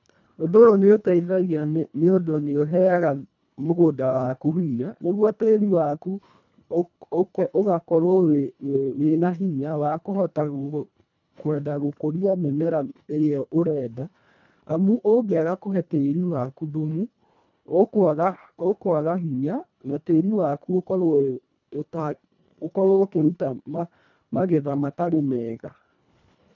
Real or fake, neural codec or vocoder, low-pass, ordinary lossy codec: fake; codec, 24 kHz, 1.5 kbps, HILCodec; 7.2 kHz; none